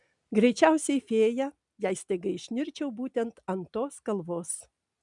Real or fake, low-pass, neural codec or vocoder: real; 10.8 kHz; none